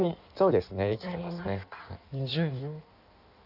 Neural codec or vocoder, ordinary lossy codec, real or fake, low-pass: codec, 16 kHz in and 24 kHz out, 1.1 kbps, FireRedTTS-2 codec; none; fake; 5.4 kHz